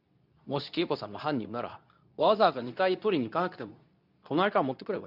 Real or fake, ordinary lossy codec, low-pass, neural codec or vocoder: fake; none; 5.4 kHz; codec, 24 kHz, 0.9 kbps, WavTokenizer, medium speech release version 2